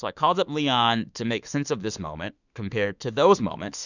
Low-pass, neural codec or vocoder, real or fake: 7.2 kHz; autoencoder, 48 kHz, 32 numbers a frame, DAC-VAE, trained on Japanese speech; fake